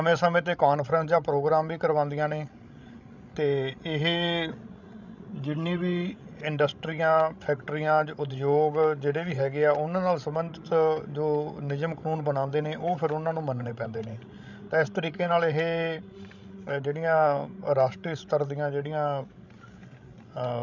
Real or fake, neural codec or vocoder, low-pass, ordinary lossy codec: fake; codec, 16 kHz, 16 kbps, FreqCodec, larger model; 7.2 kHz; none